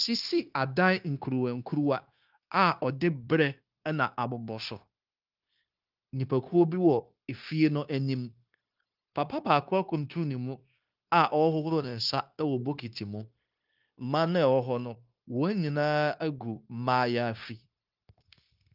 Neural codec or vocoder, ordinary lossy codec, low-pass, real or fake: codec, 16 kHz, 0.9 kbps, LongCat-Audio-Codec; Opus, 24 kbps; 5.4 kHz; fake